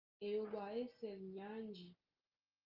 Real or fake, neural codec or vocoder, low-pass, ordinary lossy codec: real; none; 5.4 kHz; Opus, 24 kbps